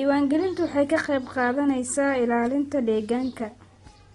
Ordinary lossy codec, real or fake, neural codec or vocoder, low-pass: AAC, 32 kbps; real; none; 10.8 kHz